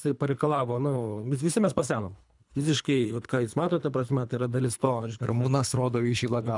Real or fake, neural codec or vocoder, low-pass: fake; codec, 24 kHz, 3 kbps, HILCodec; 10.8 kHz